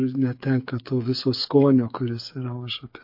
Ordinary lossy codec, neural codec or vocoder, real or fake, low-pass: MP3, 32 kbps; codec, 16 kHz, 8 kbps, FreqCodec, smaller model; fake; 5.4 kHz